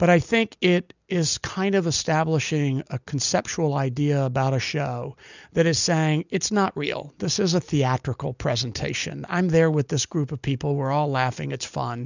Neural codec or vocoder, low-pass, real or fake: none; 7.2 kHz; real